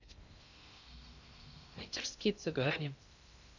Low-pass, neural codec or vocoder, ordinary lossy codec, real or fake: 7.2 kHz; codec, 16 kHz in and 24 kHz out, 0.6 kbps, FocalCodec, streaming, 2048 codes; none; fake